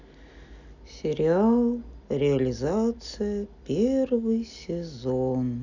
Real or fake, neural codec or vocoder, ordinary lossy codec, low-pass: real; none; none; 7.2 kHz